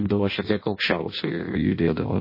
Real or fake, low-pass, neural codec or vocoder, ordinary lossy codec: fake; 5.4 kHz; codec, 16 kHz in and 24 kHz out, 0.6 kbps, FireRedTTS-2 codec; MP3, 24 kbps